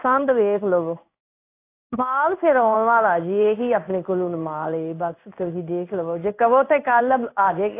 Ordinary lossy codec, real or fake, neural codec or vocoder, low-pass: AAC, 24 kbps; fake; codec, 16 kHz in and 24 kHz out, 1 kbps, XY-Tokenizer; 3.6 kHz